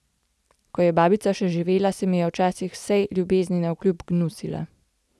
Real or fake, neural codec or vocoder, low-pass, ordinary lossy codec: real; none; none; none